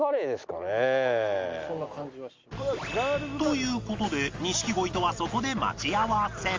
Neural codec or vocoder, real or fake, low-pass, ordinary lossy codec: none; real; 7.2 kHz; Opus, 32 kbps